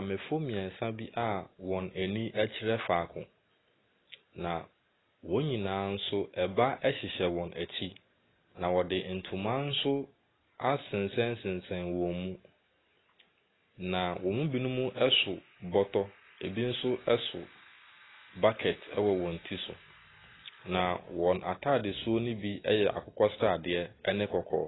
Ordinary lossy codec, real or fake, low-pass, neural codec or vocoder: AAC, 16 kbps; real; 7.2 kHz; none